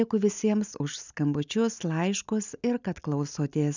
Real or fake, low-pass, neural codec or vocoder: real; 7.2 kHz; none